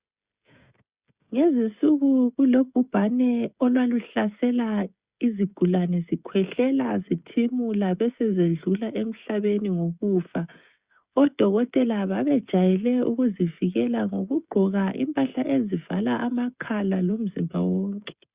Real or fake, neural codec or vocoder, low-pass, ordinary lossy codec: fake; codec, 16 kHz, 16 kbps, FreqCodec, smaller model; 3.6 kHz; Opus, 64 kbps